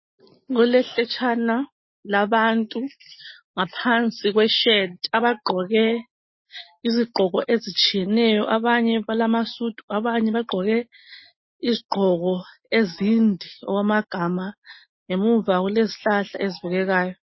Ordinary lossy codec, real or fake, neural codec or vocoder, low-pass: MP3, 24 kbps; real; none; 7.2 kHz